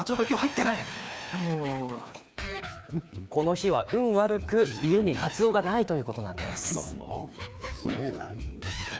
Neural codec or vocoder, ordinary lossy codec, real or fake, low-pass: codec, 16 kHz, 2 kbps, FreqCodec, larger model; none; fake; none